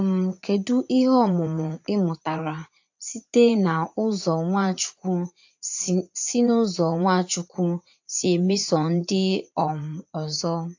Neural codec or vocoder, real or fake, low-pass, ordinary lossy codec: vocoder, 44.1 kHz, 80 mel bands, Vocos; fake; 7.2 kHz; AAC, 48 kbps